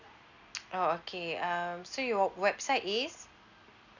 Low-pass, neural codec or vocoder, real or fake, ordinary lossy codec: 7.2 kHz; none; real; none